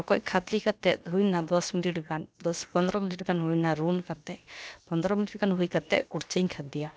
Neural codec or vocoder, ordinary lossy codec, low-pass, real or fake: codec, 16 kHz, about 1 kbps, DyCAST, with the encoder's durations; none; none; fake